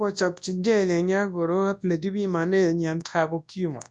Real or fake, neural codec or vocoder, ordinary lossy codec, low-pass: fake; codec, 24 kHz, 0.9 kbps, WavTokenizer, large speech release; none; 10.8 kHz